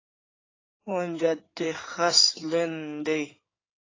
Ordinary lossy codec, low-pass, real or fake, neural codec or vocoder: AAC, 32 kbps; 7.2 kHz; fake; codec, 16 kHz in and 24 kHz out, 2.2 kbps, FireRedTTS-2 codec